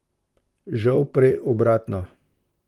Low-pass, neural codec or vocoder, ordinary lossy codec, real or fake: 19.8 kHz; vocoder, 44.1 kHz, 128 mel bands, Pupu-Vocoder; Opus, 24 kbps; fake